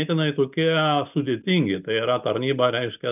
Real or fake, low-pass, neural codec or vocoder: real; 3.6 kHz; none